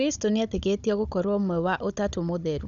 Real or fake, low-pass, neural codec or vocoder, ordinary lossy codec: fake; 7.2 kHz; codec, 16 kHz, 16 kbps, FunCodec, trained on Chinese and English, 50 frames a second; none